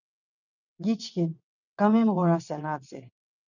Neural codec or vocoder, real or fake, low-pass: vocoder, 22.05 kHz, 80 mel bands, WaveNeXt; fake; 7.2 kHz